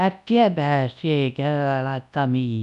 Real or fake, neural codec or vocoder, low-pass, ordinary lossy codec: fake; codec, 24 kHz, 0.9 kbps, WavTokenizer, large speech release; 10.8 kHz; none